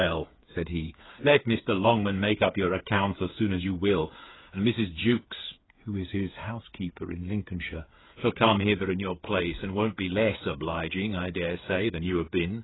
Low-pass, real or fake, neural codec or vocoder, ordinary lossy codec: 7.2 kHz; fake; codec, 16 kHz, 8 kbps, FreqCodec, smaller model; AAC, 16 kbps